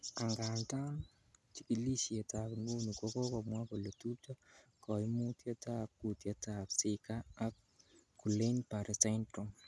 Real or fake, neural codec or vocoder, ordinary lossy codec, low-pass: real; none; none; none